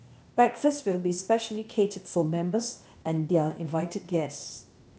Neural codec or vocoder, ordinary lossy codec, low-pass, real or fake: codec, 16 kHz, 0.8 kbps, ZipCodec; none; none; fake